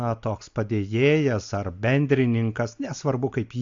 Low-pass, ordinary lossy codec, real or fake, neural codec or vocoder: 7.2 kHz; AAC, 64 kbps; real; none